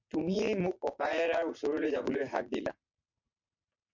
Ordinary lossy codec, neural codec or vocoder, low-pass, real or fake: MP3, 48 kbps; vocoder, 44.1 kHz, 80 mel bands, Vocos; 7.2 kHz; fake